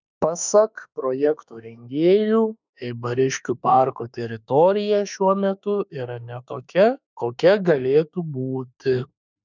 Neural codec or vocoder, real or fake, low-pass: autoencoder, 48 kHz, 32 numbers a frame, DAC-VAE, trained on Japanese speech; fake; 7.2 kHz